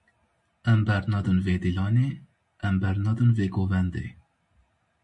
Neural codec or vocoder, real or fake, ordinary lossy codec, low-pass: none; real; MP3, 48 kbps; 10.8 kHz